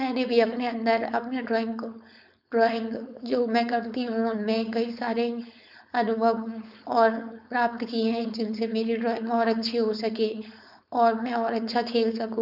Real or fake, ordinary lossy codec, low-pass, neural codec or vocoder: fake; none; 5.4 kHz; codec, 16 kHz, 4.8 kbps, FACodec